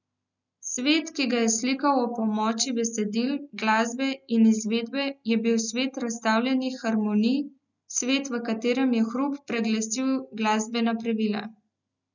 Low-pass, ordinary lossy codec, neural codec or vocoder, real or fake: 7.2 kHz; none; none; real